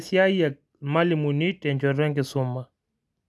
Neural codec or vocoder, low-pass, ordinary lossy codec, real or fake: none; none; none; real